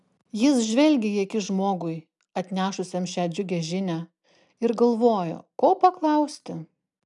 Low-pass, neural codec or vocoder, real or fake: 10.8 kHz; none; real